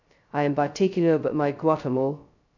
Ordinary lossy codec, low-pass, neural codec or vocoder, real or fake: none; 7.2 kHz; codec, 16 kHz, 0.2 kbps, FocalCodec; fake